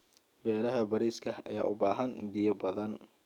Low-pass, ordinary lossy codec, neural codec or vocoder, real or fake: 19.8 kHz; none; codec, 44.1 kHz, 7.8 kbps, DAC; fake